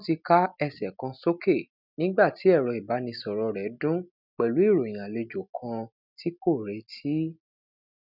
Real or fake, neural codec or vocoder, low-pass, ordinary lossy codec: real; none; 5.4 kHz; none